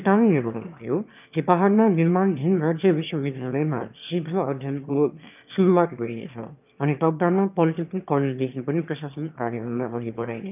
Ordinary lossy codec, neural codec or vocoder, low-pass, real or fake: none; autoencoder, 22.05 kHz, a latent of 192 numbers a frame, VITS, trained on one speaker; 3.6 kHz; fake